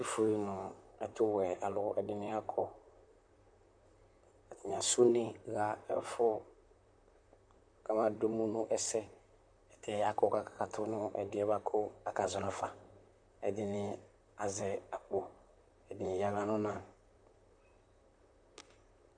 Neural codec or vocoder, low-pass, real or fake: vocoder, 44.1 kHz, 128 mel bands, Pupu-Vocoder; 9.9 kHz; fake